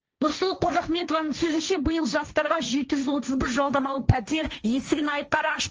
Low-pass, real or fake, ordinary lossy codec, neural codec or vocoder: 7.2 kHz; fake; Opus, 24 kbps; codec, 16 kHz, 1.1 kbps, Voila-Tokenizer